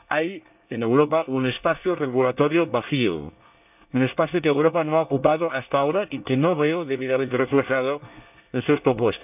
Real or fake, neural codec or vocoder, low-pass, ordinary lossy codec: fake; codec, 24 kHz, 1 kbps, SNAC; 3.6 kHz; none